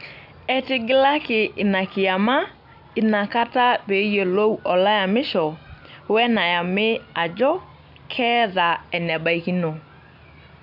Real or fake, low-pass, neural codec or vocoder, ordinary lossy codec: real; 5.4 kHz; none; none